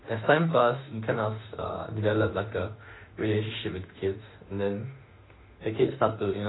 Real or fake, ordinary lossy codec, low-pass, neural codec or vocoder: fake; AAC, 16 kbps; 7.2 kHz; autoencoder, 48 kHz, 32 numbers a frame, DAC-VAE, trained on Japanese speech